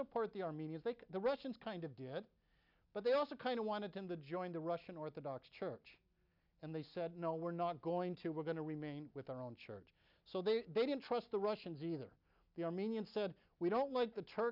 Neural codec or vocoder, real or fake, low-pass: none; real; 5.4 kHz